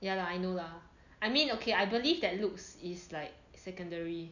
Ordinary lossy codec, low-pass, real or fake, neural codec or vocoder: none; 7.2 kHz; real; none